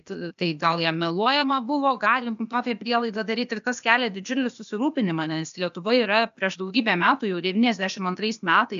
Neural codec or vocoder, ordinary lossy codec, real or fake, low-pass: codec, 16 kHz, 0.8 kbps, ZipCodec; AAC, 96 kbps; fake; 7.2 kHz